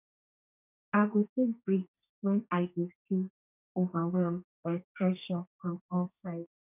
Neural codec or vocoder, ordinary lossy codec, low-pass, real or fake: codec, 32 kHz, 1.9 kbps, SNAC; none; 3.6 kHz; fake